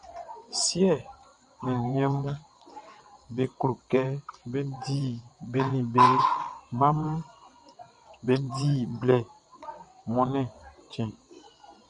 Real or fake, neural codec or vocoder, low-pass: fake; vocoder, 22.05 kHz, 80 mel bands, WaveNeXt; 9.9 kHz